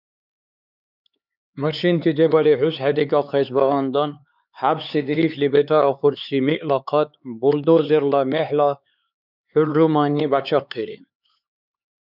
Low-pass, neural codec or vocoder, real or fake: 5.4 kHz; codec, 16 kHz, 4 kbps, X-Codec, HuBERT features, trained on LibriSpeech; fake